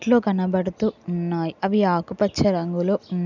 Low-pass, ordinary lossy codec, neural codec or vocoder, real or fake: 7.2 kHz; none; none; real